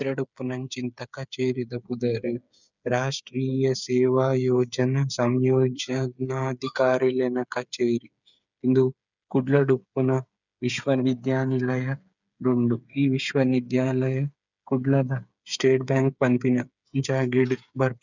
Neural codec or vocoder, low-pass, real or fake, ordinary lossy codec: codec, 16 kHz, 8 kbps, FreqCodec, smaller model; 7.2 kHz; fake; none